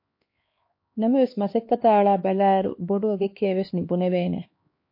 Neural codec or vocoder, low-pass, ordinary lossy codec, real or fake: codec, 16 kHz, 2 kbps, X-Codec, HuBERT features, trained on LibriSpeech; 5.4 kHz; MP3, 32 kbps; fake